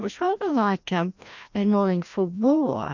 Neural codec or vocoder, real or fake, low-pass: codec, 16 kHz, 1 kbps, FreqCodec, larger model; fake; 7.2 kHz